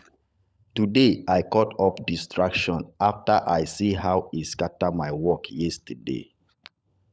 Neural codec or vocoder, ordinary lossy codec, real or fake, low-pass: codec, 16 kHz, 16 kbps, FunCodec, trained on LibriTTS, 50 frames a second; none; fake; none